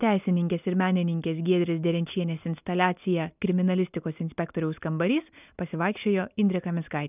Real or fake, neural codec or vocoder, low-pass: real; none; 3.6 kHz